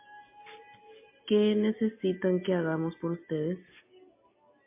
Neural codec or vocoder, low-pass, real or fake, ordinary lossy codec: none; 3.6 kHz; real; MP3, 24 kbps